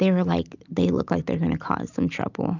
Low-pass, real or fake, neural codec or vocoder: 7.2 kHz; real; none